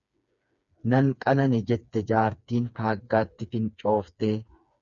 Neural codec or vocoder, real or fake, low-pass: codec, 16 kHz, 4 kbps, FreqCodec, smaller model; fake; 7.2 kHz